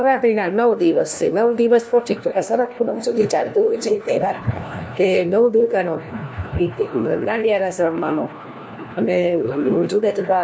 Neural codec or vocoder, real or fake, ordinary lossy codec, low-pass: codec, 16 kHz, 1 kbps, FunCodec, trained on LibriTTS, 50 frames a second; fake; none; none